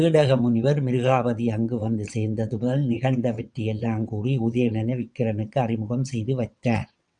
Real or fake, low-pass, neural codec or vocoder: fake; 9.9 kHz; vocoder, 22.05 kHz, 80 mel bands, WaveNeXt